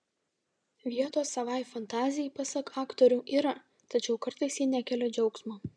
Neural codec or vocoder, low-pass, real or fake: none; 9.9 kHz; real